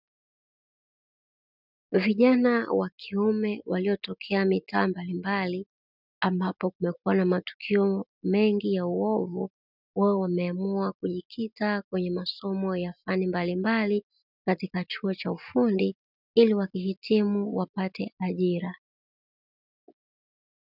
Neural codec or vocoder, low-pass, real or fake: none; 5.4 kHz; real